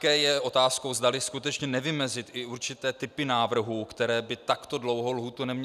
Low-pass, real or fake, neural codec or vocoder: 14.4 kHz; real; none